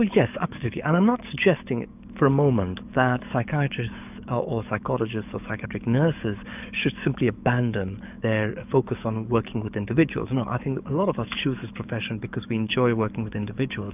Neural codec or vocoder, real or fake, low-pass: codec, 44.1 kHz, 7.8 kbps, DAC; fake; 3.6 kHz